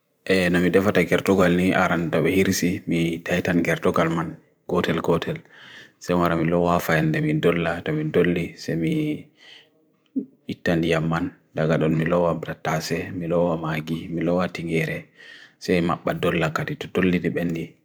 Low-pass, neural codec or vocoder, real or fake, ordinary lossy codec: none; vocoder, 44.1 kHz, 128 mel bands every 512 samples, BigVGAN v2; fake; none